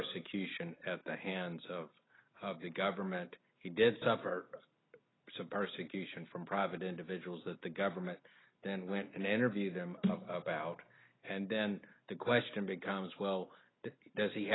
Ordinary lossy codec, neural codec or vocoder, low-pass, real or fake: AAC, 16 kbps; none; 7.2 kHz; real